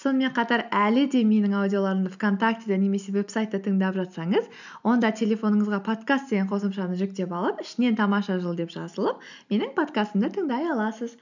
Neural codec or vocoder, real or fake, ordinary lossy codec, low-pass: none; real; none; 7.2 kHz